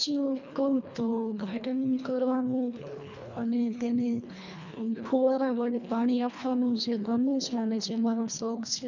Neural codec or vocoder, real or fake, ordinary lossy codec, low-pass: codec, 24 kHz, 1.5 kbps, HILCodec; fake; none; 7.2 kHz